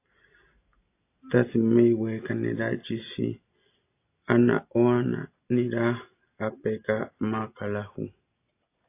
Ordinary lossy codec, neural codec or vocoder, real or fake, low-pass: AAC, 24 kbps; none; real; 3.6 kHz